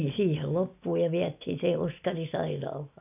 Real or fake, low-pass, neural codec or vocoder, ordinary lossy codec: real; 3.6 kHz; none; none